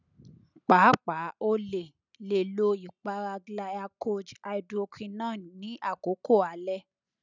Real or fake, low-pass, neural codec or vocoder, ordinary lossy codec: real; 7.2 kHz; none; none